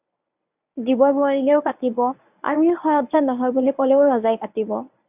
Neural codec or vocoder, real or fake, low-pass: codec, 24 kHz, 0.9 kbps, WavTokenizer, medium speech release version 1; fake; 3.6 kHz